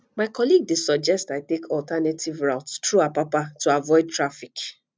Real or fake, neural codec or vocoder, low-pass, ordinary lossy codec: real; none; none; none